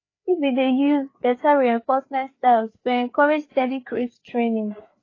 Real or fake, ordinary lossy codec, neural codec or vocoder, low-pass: fake; AAC, 32 kbps; codec, 16 kHz, 4 kbps, FreqCodec, larger model; 7.2 kHz